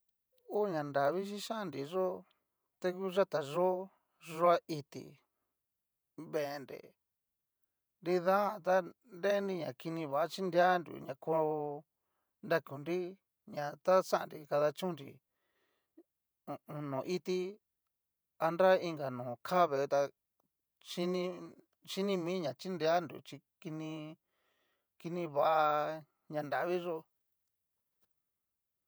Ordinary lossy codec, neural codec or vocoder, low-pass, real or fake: none; vocoder, 48 kHz, 128 mel bands, Vocos; none; fake